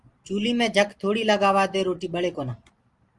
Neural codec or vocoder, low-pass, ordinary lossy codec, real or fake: none; 10.8 kHz; Opus, 24 kbps; real